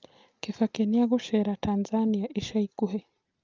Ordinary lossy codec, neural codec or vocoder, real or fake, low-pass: Opus, 32 kbps; none; real; 7.2 kHz